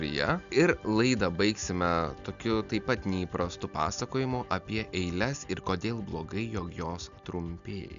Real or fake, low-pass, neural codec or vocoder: real; 7.2 kHz; none